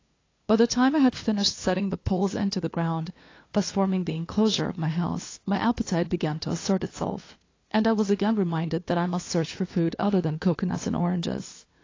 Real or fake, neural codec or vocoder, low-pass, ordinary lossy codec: fake; codec, 16 kHz, 2 kbps, FunCodec, trained on LibriTTS, 25 frames a second; 7.2 kHz; AAC, 32 kbps